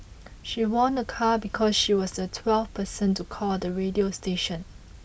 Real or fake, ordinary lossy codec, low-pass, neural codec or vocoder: real; none; none; none